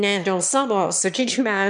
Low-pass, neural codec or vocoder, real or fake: 9.9 kHz; autoencoder, 22.05 kHz, a latent of 192 numbers a frame, VITS, trained on one speaker; fake